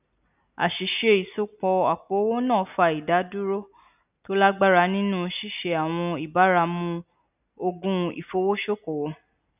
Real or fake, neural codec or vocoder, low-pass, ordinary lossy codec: real; none; 3.6 kHz; none